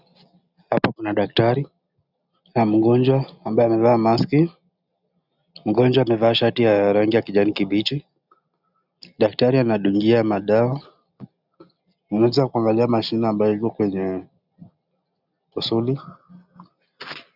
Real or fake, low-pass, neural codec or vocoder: real; 5.4 kHz; none